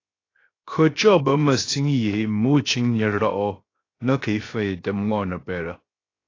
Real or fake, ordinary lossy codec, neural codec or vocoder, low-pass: fake; AAC, 32 kbps; codec, 16 kHz, 0.7 kbps, FocalCodec; 7.2 kHz